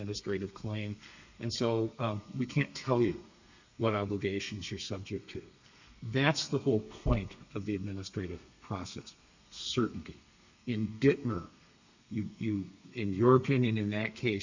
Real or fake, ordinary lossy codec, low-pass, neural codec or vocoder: fake; Opus, 64 kbps; 7.2 kHz; codec, 32 kHz, 1.9 kbps, SNAC